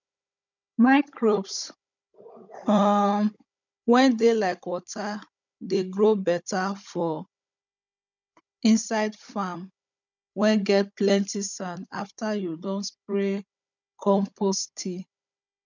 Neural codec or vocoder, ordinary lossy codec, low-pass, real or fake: codec, 16 kHz, 16 kbps, FunCodec, trained on Chinese and English, 50 frames a second; none; 7.2 kHz; fake